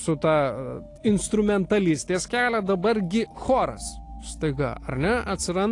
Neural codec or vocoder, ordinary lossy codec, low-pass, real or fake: none; AAC, 48 kbps; 10.8 kHz; real